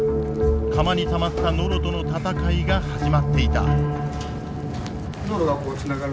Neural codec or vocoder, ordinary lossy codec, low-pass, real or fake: none; none; none; real